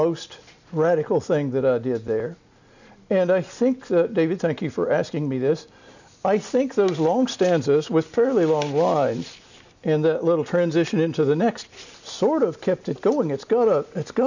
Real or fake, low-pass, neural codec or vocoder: real; 7.2 kHz; none